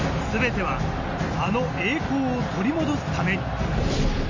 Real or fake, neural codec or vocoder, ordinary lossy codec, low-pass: real; none; AAC, 48 kbps; 7.2 kHz